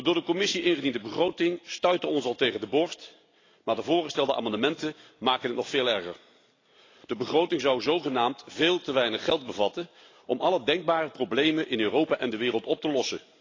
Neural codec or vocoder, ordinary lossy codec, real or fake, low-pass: none; AAC, 32 kbps; real; 7.2 kHz